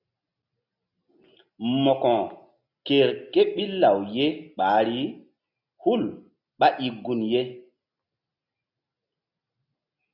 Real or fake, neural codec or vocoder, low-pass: real; none; 5.4 kHz